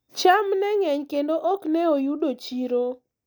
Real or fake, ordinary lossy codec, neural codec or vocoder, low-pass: real; none; none; none